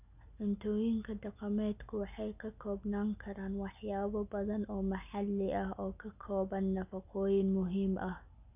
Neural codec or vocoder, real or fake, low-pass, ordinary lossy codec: none; real; 3.6 kHz; none